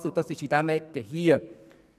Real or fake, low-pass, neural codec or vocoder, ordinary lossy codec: fake; 14.4 kHz; codec, 44.1 kHz, 2.6 kbps, SNAC; none